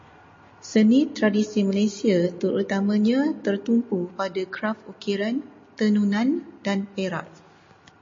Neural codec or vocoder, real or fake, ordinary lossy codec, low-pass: none; real; MP3, 32 kbps; 7.2 kHz